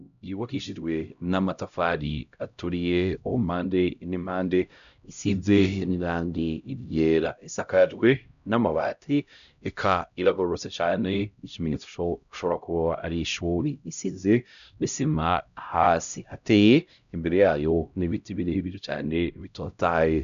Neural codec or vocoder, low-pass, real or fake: codec, 16 kHz, 0.5 kbps, X-Codec, HuBERT features, trained on LibriSpeech; 7.2 kHz; fake